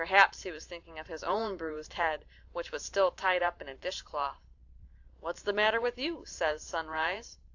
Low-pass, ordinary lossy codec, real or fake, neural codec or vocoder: 7.2 kHz; AAC, 48 kbps; fake; vocoder, 44.1 kHz, 128 mel bands every 512 samples, BigVGAN v2